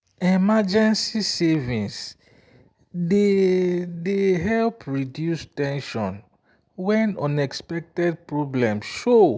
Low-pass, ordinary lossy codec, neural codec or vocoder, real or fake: none; none; none; real